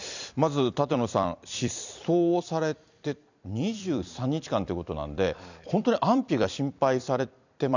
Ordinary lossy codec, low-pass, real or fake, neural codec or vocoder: none; 7.2 kHz; fake; vocoder, 44.1 kHz, 128 mel bands every 256 samples, BigVGAN v2